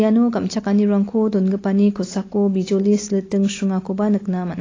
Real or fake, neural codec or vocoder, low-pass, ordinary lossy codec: real; none; 7.2 kHz; AAC, 32 kbps